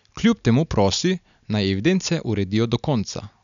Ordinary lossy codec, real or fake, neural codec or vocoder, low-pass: none; real; none; 7.2 kHz